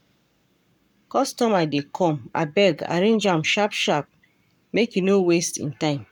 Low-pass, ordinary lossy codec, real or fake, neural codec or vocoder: 19.8 kHz; none; fake; codec, 44.1 kHz, 7.8 kbps, Pupu-Codec